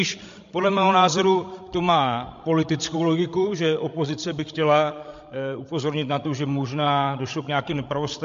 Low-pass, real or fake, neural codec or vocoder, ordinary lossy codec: 7.2 kHz; fake; codec, 16 kHz, 16 kbps, FreqCodec, larger model; MP3, 48 kbps